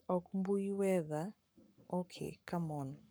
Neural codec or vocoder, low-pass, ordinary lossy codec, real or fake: codec, 44.1 kHz, 7.8 kbps, Pupu-Codec; none; none; fake